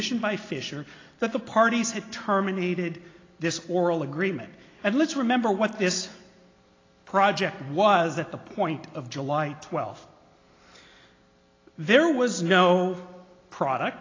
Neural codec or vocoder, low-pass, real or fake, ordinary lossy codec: none; 7.2 kHz; real; AAC, 32 kbps